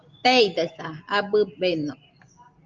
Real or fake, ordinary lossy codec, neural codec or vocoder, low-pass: real; Opus, 24 kbps; none; 7.2 kHz